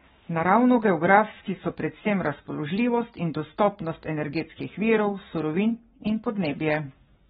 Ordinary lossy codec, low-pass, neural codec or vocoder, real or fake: AAC, 16 kbps; 19.8 kHz; codec, 44.1 kHz, 7.8 kbps, Pupu-Codec; fake